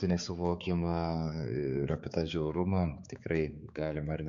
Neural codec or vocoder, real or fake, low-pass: codec, 16 kHz, 4 kbps, X-Codec, HuBERT features, trained on balanced general audio; fake; 7.2 kHz